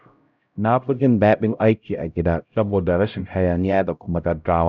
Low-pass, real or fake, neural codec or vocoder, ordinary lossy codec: 7.2 kHz; fake; codec, 16 kHz, 0.5 kbps, X-Codec, HuBERT features, trained on LibriSpeech; none